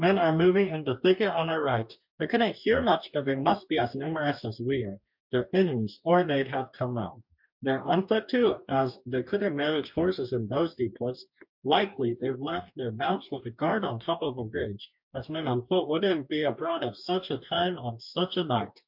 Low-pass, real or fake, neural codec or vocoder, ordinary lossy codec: 5.4 kHz; fake; codec, 44.1 kHz, 2.6 kbps, DAC; MP3, 48 kbps